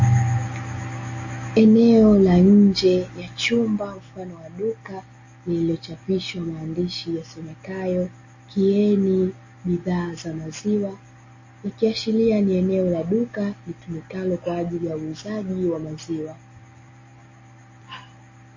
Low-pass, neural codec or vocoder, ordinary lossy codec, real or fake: 7.2 kHz; none; MP3, 32 kbps; real